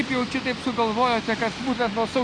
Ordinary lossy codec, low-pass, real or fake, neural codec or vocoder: Opus, 64 kbps; 9.9 kHz; real; none